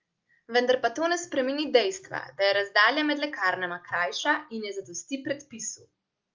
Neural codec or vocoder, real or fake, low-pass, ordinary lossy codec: none; real; 7.2 kHz; Opus, 32 kbps